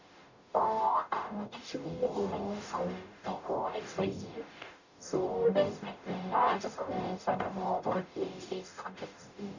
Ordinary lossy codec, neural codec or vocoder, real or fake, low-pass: none; codec, 44.1 kHz, 0.9 kbps, DAC; fake; 7.2 kHz